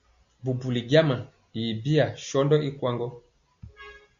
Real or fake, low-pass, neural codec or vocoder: real; 7.2 kHz; none